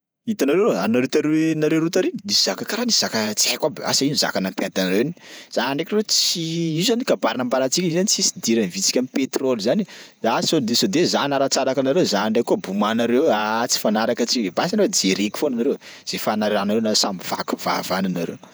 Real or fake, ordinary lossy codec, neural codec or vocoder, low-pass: fake; none; vocoder, 48 kHz, 128 mel bands, Vocos; none